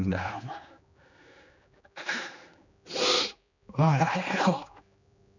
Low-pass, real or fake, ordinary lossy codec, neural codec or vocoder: 7.2 kHz; fake; none; codec, 16 kHz, 2 kbps, X-Codec, HuBERT features, trained on general audio